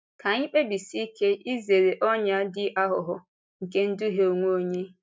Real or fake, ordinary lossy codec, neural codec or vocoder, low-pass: real; none; none; none